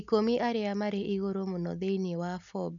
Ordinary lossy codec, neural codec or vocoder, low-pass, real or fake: none; none; 7.2 kHz; real